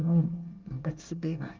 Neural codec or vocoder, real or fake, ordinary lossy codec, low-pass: codec, 24 kHz, 1 kbps, SNAC; fake; Opus, 24 kbps; 7.2 kHz